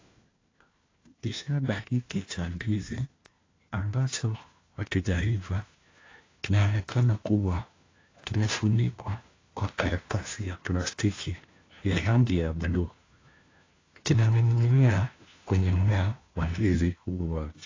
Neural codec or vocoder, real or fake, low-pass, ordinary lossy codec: codec, 16 kHz, 1 kbps, FunCodec, trained on LibriTTS, 50 frames a second; fake; 7.2 kHz; AAC, 32 kbps